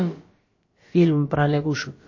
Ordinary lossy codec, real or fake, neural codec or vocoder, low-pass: MP3, 32 kbps; fake; codec, 16 kHz, about 1 kbps, DyCAST, with the encoder's durations; 7.2 kHz